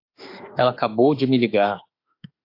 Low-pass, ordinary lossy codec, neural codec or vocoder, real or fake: 5.4 kHz; AAC, 32 kbps; autoencoder, 48 kHz, 32 numbers a frame, DAC-VAE, trained on Japanese speech; fake